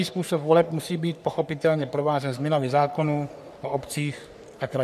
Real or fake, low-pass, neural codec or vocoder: fake; 14.4 kHz; codec, 44.1 kHz, 3.4 kbps, Pupu-Codec